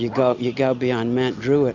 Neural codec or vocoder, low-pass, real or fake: none; 7.2 kHz; real